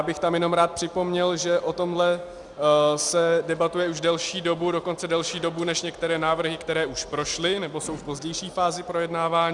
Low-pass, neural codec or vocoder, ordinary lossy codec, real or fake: 10.8 kHz; none; Opus, 64 kbps; real